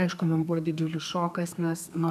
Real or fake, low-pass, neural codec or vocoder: fake; 14.4 kHz; codec, 32 kHz, 1.9 kbps, SNAC